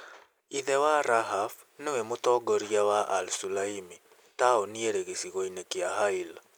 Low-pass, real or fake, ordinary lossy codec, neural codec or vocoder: none; real; none; none